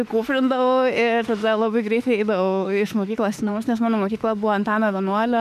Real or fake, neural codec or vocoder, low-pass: fake; autoencoder, 48 kHz, 32 numbers a frame, DAC-VAE, trained on Japanese speech; 14.4 kHz